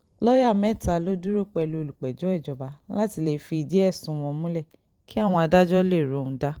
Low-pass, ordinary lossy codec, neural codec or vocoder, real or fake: 19.8 kHz; Opus, 24 kbps; vocoder, 44.1 kHz, 128 mel bands every 512 samples, BigVGAN v2; fake